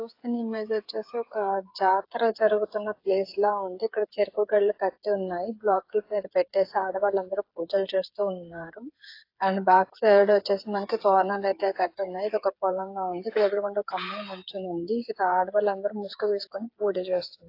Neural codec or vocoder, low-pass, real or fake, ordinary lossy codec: codec, 16 kHz, 8 kbps, FreqCodec, smaller model; 5.4 kHz; fake; AAC, 32 kbps